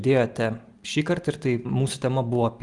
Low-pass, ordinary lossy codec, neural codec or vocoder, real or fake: 10.8 kHz; Opus, 32 kbps; none; real